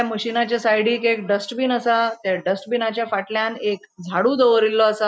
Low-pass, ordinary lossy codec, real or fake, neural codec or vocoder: none; none; real; none